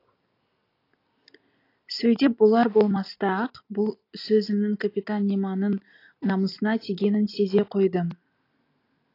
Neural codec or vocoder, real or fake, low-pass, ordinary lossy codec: none; real; 5.4 kHz; AAC, 32 kbps